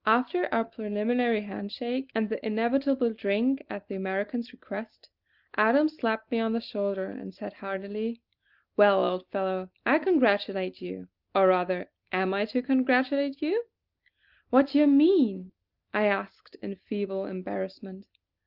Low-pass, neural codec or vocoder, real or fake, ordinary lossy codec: 5.4 kHz; none; real; Opus, 32 kbps